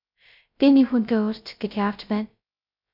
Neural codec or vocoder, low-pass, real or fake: codec, 16 kHz, 0.2 kbps, FocalCodec; 5.4 kHz; fake